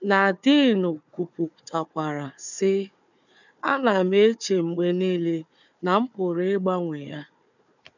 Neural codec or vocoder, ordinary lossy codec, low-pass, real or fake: codec, 16 kHz, 4 kbps, FunCodec, trained on Chinese and English, 50 frames a second; none; 7.2 kHz; fake